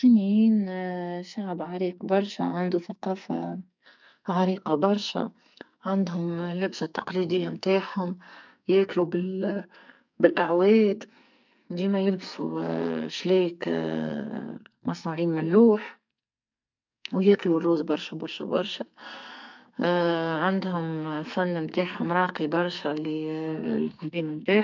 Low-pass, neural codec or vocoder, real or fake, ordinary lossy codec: 7.2 kHz; codec, 44.1 kHz, 2.6 kbps, SNAC; fake; MP3, 64 kbps